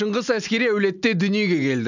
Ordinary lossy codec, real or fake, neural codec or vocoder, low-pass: none; real; none; 7.2 kHz